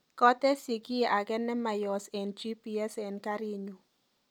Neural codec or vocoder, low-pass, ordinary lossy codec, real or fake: none; none; none; real